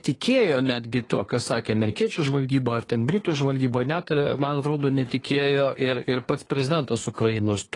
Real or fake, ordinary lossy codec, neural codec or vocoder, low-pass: fake; AAC, 32 kbps; codec, 24 kHz, 1 kbps, SNAC; 10.8 kHz